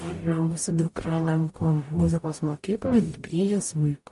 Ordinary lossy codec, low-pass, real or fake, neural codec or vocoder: MP3, 48 kbps; 14.4 kHz; fake; codec, 44.1 kHz, 0.9 kbps, DAC